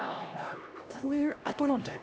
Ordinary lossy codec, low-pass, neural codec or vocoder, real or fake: none; none; codec, 16 kHz, 1 kbps, X-Codec, HuBERT features, trained on LibriSpeech; fake